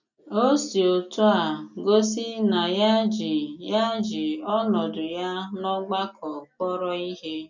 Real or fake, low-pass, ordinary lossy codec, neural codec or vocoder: real; 7.2 kHz; none; none